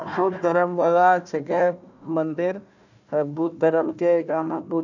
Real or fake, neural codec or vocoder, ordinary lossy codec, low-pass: fake; codec, 16 kHz, 1 kbps, FunCodec, trained on Chinese and English, 50 frames a second; none; 7.2 kHz